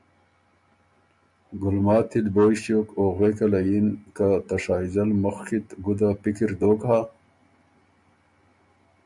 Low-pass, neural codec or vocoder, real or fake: 10.8 kHz; none; real